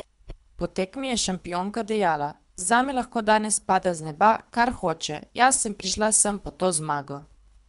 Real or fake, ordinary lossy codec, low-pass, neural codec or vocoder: fake; none; 10.8 kHz; codec, 24 kHz, 3 kbps, HILCodec